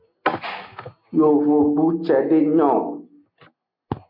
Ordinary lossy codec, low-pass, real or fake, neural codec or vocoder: MP3, 48 kbps; 5.4 kHz; real; none